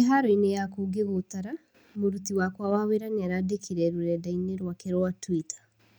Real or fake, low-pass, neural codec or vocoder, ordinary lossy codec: real; none; none; none